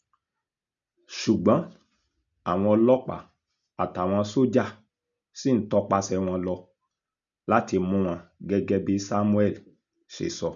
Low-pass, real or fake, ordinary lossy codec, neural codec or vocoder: 7.2 kHz; real; none; none